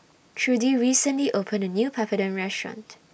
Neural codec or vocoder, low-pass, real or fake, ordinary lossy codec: none; none; real; none